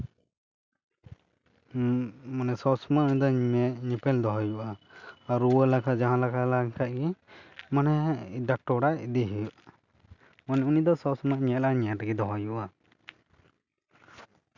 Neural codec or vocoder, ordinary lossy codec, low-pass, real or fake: none; none; 7.2 kHz; real